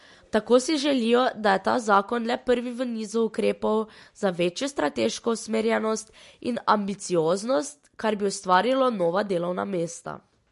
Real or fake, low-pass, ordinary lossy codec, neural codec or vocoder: real; 14.4 kHz; MP3, 48 kbps; none